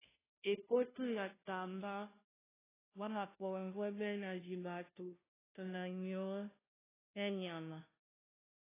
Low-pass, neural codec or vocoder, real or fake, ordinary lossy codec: 3.6 kHz; codec, 16 kHz, 0.5 kbps, FunCodec, trained on Chinese and English, 25 frames a second; fake; AAC, 16 kbps